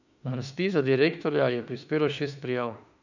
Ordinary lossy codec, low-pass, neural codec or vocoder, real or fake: none; 7.2 kHz; autoencoder, 48 kHz, 32 numbers a frame, DAC-VAE, trained on Japanese speech; fake